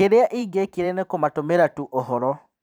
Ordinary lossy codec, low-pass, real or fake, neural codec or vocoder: none; none; fake; vocoder, 44.1 kHz, 128 mel bands every 512 samples, BigVGAN v2